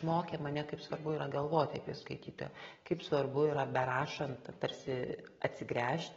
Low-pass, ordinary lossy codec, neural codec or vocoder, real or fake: 7.2 kHz; AAC, 24 kbps; none; real